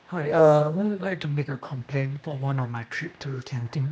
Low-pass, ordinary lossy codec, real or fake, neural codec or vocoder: none; none; fake; codec, 16 kHz, 1 kbps, X-Codec, HuBERT features, trained on general audio